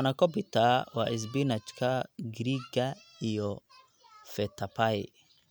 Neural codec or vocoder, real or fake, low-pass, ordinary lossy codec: none; real; none; none